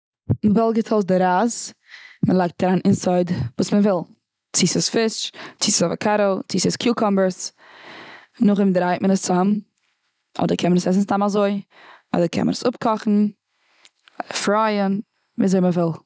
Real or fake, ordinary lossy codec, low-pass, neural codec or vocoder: real; none; none; none